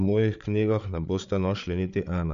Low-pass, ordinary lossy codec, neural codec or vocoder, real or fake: 7.2 kHz; AAC, 96 kbps; codec, 16 kHz, 8 kbps, FreqCodec, larger model; fake